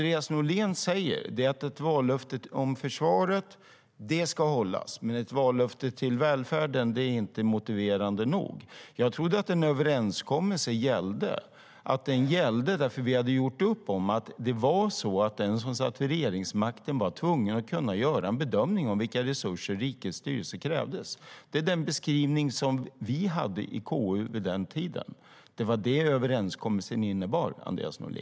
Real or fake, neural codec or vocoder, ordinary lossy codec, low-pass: real; none; none; none